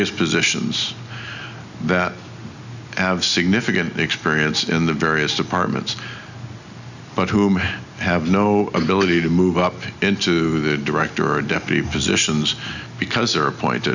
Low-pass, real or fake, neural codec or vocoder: 7.2 kHz; real; none